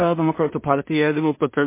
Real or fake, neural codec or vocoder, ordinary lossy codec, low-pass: fake; codec, 16 kHz in and 24 kHz out, 0.4 kbps, LongCat-Audio-Codec, two codebook decoder; MP3, 24 kbps; 3.6 kHz